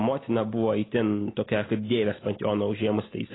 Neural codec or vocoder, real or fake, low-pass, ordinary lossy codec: none; real; 7.2 kHz; AAC, 16 kbps